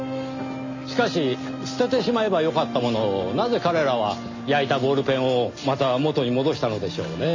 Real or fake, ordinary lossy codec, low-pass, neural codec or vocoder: real; MP3, 32 kbps; 7.2 kHz; none